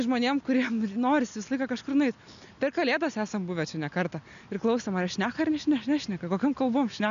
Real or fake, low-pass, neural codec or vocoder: real; 7.2 kHz; none